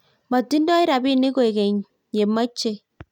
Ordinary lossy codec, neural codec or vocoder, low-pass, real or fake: none; none; 19.8 kHz; real